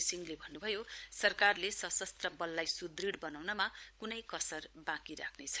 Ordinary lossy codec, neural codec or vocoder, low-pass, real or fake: none; codec, 16 kHz, 16 kbps, FunCodec, trained on LibriTTS, 50 frames a second; none; fake